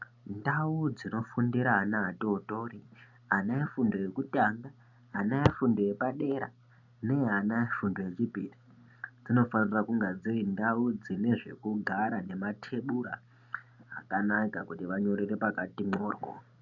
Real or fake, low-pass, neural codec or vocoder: real; 7.2 kHz; none